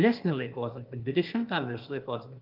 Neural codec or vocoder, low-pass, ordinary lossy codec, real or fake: codec, 16 kHz, 0.8 kbps, ZipCodec; 5.4 kHz; Opus, 32 kbps; fake